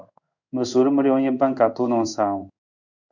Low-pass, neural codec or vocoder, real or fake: 7.2 kHz; codec, 16 kHz in and 24 kHz out, 1 kbps, XY-Tokenizer; fake